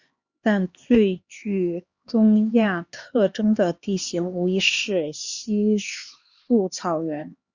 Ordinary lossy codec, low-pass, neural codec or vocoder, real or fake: Opus, 64 kbps; 7.2 kHz; codec, 16 kHz, 2 kbps, X-Codec, HuBERT features, trained on LibriSpeech; fake